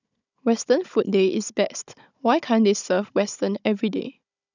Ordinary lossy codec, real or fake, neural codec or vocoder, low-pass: none; fake; codec, 16 kHz, 16 kbps, FunCodec, trained on Chinese and English, 50 frames a second; 7.2 kHz